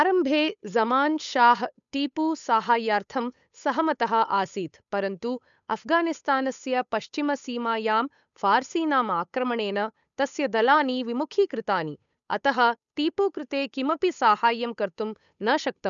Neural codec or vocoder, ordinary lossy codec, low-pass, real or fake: codec, 16 kHz, 8 kbps, FunCodec, trained on Chinese and English, 25 frames a second; none; 7.2 kHz; fake